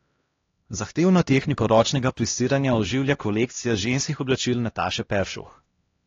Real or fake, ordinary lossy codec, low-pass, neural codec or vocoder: fake; AAC, 32 kbps; 7.2 kHz; codec, 16 kHz, 1 kbps, X-Codec, HuBERT features, trained on LibriSpeech